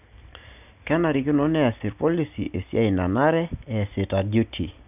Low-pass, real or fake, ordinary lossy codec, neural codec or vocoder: 3.6 kHz; real; none; none